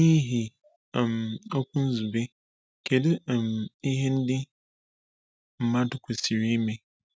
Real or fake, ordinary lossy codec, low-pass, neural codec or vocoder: real; none; none; none